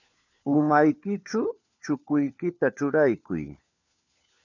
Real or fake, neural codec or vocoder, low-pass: fake; codec, 16 kHz, 4 kbps, FunCodec, trained on LibriTTS, 50 frames a second; 7.2 kHz